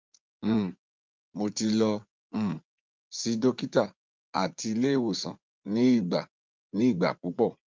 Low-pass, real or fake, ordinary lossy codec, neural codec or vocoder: 7.2 kHz; fake; Opus, 24 kbps; vocoder, 44.1 kHz, 80 mel bands, Vocos